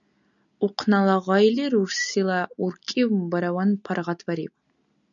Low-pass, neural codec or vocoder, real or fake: 7.2 kHz; none; real